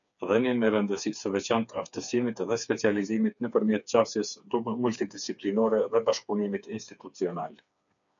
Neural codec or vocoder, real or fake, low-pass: codec, 16 kHz, 4 kbps, FreqCodec, smaller model; fake; 7.2 kHz